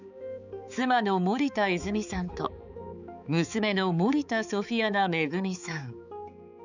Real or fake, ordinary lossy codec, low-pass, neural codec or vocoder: fake; none; 7.2 kHz; codec, 16 kHz, 4 kbps, X-Codec, HuBERT features, trained on balanced general audio